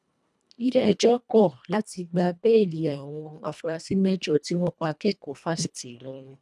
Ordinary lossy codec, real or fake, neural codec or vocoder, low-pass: none; fake; codec, 24 kHz, 1.5 kbps, HILCodec; none